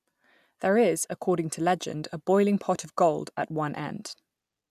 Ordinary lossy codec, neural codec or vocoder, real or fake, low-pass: none; none; real; 14.4 kHz